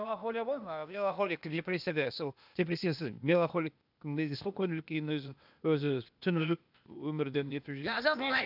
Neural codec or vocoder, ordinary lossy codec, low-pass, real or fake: codec, 16 kHz, 0.8 kbps, ZipCodec; none; 5.4 kHz; fake